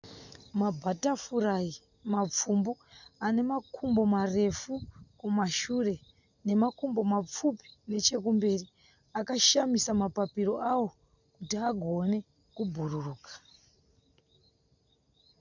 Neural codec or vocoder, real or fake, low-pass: none; real; 7.2 kHz